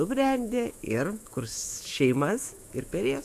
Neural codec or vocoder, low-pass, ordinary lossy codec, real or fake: autoencoder, 48 kHz, 128 numbers a frame, DAC-VAE, trained on Japanese speech; 14.4 kHz; AAC, 96 kbps; fake